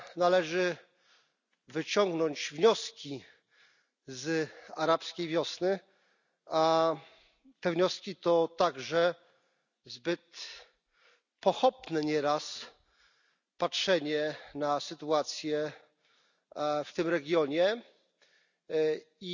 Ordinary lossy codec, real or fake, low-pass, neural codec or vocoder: none; real; 7.2 kHz; none